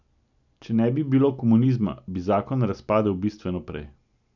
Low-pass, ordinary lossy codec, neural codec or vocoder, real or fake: 7.2 kHz; none; none; real